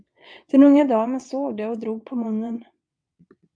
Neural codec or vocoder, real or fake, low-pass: vocoder, 22.05 kHz, 80 mel bands, WaveNeXt; fake; 9.9 kHz